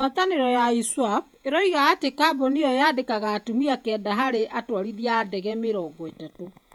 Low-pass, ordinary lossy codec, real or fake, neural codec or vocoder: 19.8 kHz; none; fake; vocoder, 48 kHz, 128 mel bands, Vocos